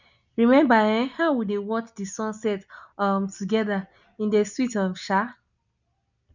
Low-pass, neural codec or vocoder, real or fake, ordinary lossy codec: 7.2 kHz; none; real; none